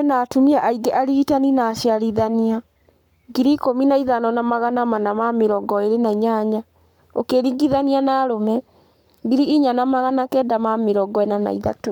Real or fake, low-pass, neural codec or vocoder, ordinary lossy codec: fake; 19.8 kHz; codec, 44.1 kHz, 7.8 kbps, Pupu-Codec; none